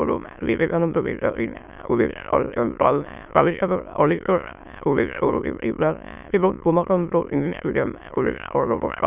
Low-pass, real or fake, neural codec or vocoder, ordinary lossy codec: 3.6 kHz; fake; autoencoder, 22.05 kHz, a latent of 192 numbers a frame, VITS, trained on many speakers; none